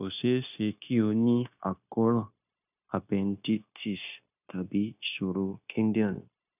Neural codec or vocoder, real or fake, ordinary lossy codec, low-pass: codec, 16 kHz, 0.9 kbps, LongCat-Audio-Codec; fake; none; 3.6 kHz